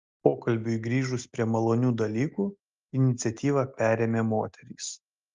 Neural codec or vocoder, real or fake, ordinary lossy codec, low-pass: none; real; Opus, 32 kbps; 7.2 kHz